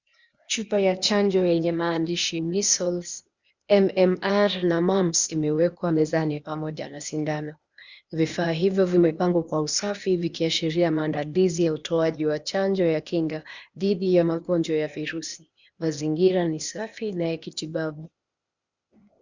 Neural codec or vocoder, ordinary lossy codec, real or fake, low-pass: codec, 16 kHz, 0.8 kbps, ZipCodec; Opus, 64 kbps; fake; 7.2 kHz